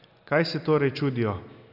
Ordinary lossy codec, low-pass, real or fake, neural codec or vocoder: none; 5.4 kHz; real; none